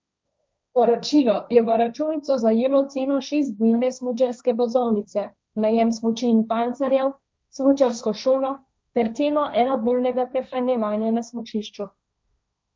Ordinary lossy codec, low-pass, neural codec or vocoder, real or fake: none; none; codec, 16 kHz, 1.1 kbps, Voila-Tokenizer; fake